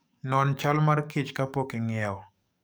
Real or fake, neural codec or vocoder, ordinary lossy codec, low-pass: fake; codec, 44.1 kHz, 7.8 kbps, DAC; none; none